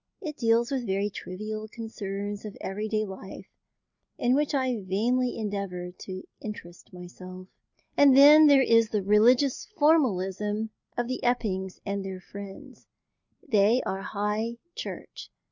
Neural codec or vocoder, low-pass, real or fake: none; 7.2 kHz; real